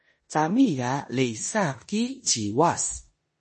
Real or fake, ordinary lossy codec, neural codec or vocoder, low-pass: fake; MP3, 32 kbps; codec, 16 kHz in and 24 kHz out, 0.9 kbps, LongCat-Audio-Codec, four codebook decoder; 10.8 kHz